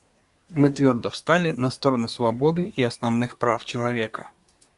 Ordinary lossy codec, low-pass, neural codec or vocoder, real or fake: Opus, 64 kbps; 10.8 kHz; codec, 24 kHz, 1 kbps, SNAC; fake